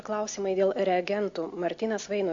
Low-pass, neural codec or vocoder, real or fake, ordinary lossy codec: 7.2 kHz; none; real; MP3, 48 kbps